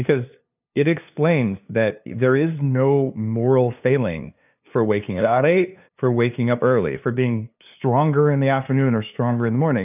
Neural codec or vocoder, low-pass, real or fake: codec, 16 kHz, 2 kbps, FunCodec, trained on LibriTTS, 25 frames a second; 3.6 kHz; fake